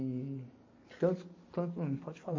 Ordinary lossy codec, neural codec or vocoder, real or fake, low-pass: MP3, 32 kbps; none; real; 7.2 kHz